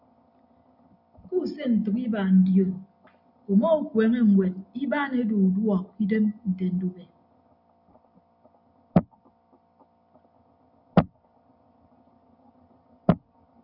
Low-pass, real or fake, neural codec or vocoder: 5.4 kHz; real; none